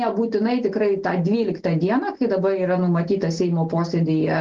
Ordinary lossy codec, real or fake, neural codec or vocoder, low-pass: Opus, 16 kbps; real; none; 7.2 kHz